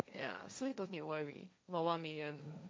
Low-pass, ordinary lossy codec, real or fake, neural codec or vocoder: none; none; fake; codec, 16 kHz, 1.1 kbps, Voila-Tokenizer